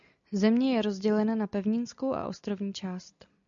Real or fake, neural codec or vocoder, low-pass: real; none; 7.2 kHz